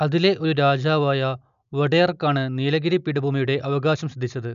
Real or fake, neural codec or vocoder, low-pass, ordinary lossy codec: real; none; 7.2 kHz; none